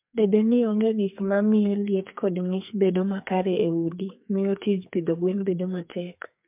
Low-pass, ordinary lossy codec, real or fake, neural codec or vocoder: 3.6 kHz; MP3, 32 kbps; fake; codec, 44.1 kHz, 2.6 kbps, SNAC